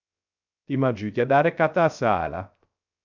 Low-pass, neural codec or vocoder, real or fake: 7.2 kHz; codec, 16 kHz, 0.3 kbps, FocalCodec; fake